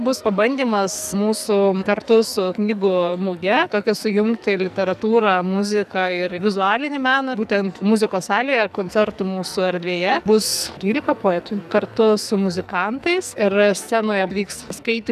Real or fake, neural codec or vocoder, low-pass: fake; codec, 44.1 kHz, 2.6 kbps, SNAC; 14.4 kHz